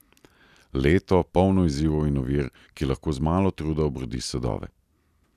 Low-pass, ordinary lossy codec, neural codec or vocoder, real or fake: 14.4 kHz; none; vocoder, 44.1 kHz, 128 mel bands every 256 samples, BigVGAN v2; fake